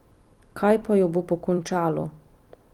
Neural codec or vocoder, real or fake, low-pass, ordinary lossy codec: vocoder, 44.1 kHz, 128 mel bands every 256 samples, BigVGAN v2; fake; 19.8 kHz; Opus, 24 kbps